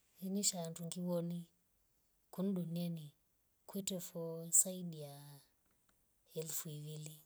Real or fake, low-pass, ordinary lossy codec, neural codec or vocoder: real; none; none; none